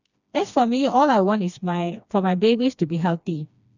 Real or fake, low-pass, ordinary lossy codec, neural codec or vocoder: fake; 7.2 kHz; none; codec, 16 kHz, 2 kbps, FreqCodec, smaller model